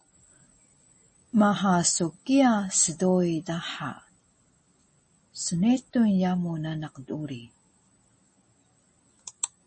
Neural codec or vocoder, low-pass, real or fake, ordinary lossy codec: none; 10.8 kHz; real; MP3, 32 kbps